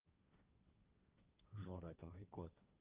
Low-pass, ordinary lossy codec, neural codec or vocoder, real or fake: 3.6 kHz; Opus, 32 kbps; codec, 16 kHz, 1.1 kbps, Voila-Tokenizer; fake